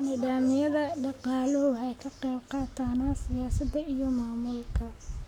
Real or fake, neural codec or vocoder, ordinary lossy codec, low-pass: fake; codec, 44.1 kHz, 7.8 kbps, Pupu-Codec; none; 19.8 kHz